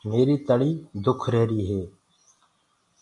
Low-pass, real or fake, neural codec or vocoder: 10.8 kHz; real; none